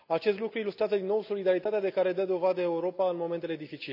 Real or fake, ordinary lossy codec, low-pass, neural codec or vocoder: real; none; 5.4 kHz; none